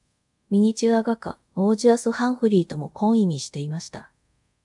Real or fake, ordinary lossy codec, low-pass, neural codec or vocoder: fake; MP3, 96 kbps; 10.8 kHz; codec, 24 kHz, 0.5 kbps, DualCodec